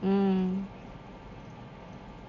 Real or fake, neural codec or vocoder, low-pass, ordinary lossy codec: real; none; 7.2 kHz; none